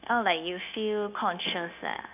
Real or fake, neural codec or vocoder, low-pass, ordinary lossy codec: fake; codec, 16 kHz, 0.9 kbps, LongCat-Audio-Codec; 3.6 kHz; none